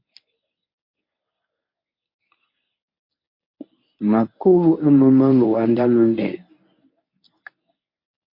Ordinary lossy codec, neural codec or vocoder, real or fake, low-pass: MP3, 32 kbps; codec, 24 kHz, 0.9 kbps, WavTokenizer, medium speech release version 1; fake; 5.4 kHz